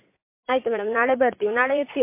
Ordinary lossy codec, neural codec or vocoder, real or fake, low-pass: MP3, 24 kbps; vocoder, 44.1 kHz, 80 mel bands, Vocos; fake; 3.6 kHz